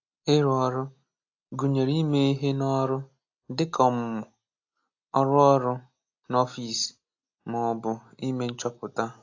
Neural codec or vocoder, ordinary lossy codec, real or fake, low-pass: none; AAC, 48 kbps; real; 7.2 kHz